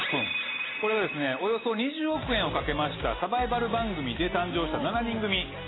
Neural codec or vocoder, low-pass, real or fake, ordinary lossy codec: none; 7.2 kHz; real; AAC, 16 kbps